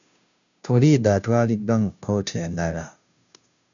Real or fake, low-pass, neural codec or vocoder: fake; 7.2 kHz; codec, 16 kHz, 0.5 kbps, FunCodec, trained on Chinese and English, 25 frames a second